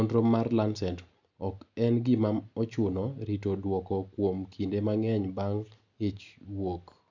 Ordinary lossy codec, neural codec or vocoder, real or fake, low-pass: none; none; real; 7.2 kHz